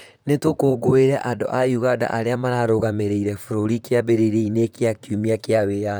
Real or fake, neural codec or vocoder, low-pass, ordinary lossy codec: fake; vocoder, 44.1 kHz, 128 mel bands, Pupu-Vocoder; none; none